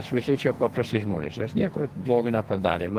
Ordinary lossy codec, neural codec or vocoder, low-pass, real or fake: Opus, 24 kbps; codec, 44.1 kHz, 2.6 kbps, SNAC; 14.4 kHz; fake